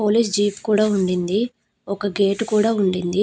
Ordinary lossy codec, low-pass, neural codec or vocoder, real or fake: none; none; none; real